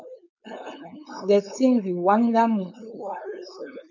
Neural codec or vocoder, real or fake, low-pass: codec, 16 kHz, 4.8 kbps, FACodec; fake; 7.2 kHz